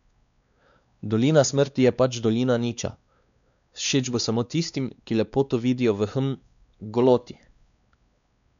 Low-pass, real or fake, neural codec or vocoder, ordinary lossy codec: 7.2 kHz; fake; codec, 16 kHz, 2 kbps, X-Codec, WavLM features, trained on Multilingual LibriSpeech; none